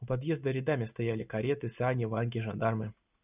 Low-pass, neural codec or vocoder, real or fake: 3.6 kHz; none; real